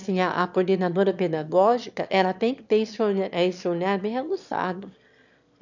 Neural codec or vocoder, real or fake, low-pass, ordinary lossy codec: autoencoder, 22.05 kHz, a latent of 192 numbers a frame, VITS, trained on one speaker; fake; 7.2 kHz; none